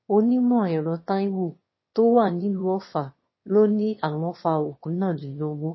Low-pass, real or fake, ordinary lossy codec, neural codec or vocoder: 7.2 kHz; fake; MP3, 24 kbps; autoencoder, 22.05 kHz, a latent of 192 numbers a frame, VITS, trained on one speaker